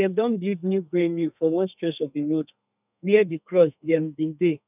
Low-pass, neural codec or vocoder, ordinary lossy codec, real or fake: 3.6 kHz; codec, 32 kHz, 1.9 kbps, SNAC; none; fake